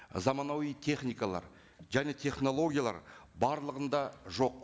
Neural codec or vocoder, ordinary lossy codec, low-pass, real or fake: none; none; none; real